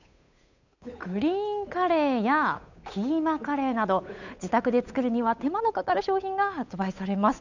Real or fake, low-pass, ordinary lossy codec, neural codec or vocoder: fake; 7.2 kHz; none; codec, 16 kHz, 8 kbps, FunCodec, trained on Chinese and English, 25 frames a second